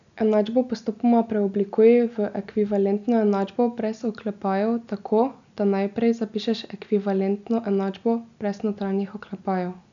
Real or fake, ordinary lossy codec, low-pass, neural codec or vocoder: real; none; 7.2 kHz; none